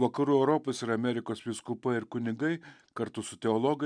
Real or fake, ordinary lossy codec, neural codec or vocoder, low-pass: real; MP3, 96 kbps; none; 9.9 kHz